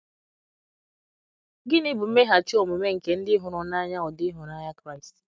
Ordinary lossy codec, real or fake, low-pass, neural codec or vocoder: none; real; none; none